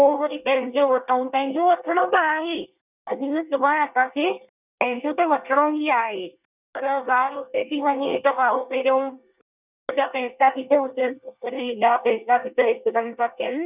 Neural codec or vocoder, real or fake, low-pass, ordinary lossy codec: codec, 24 kHz, 1 kbps, SNAC; fake; 3.6 kHz; none